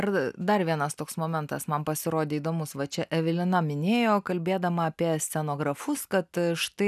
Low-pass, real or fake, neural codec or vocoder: 14.4 kHz; real; none